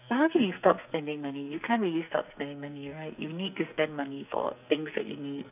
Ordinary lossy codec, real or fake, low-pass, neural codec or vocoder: none; fake; 3.6 kHz; codec, 44.1 kHz, 2.6 kbps, SNAC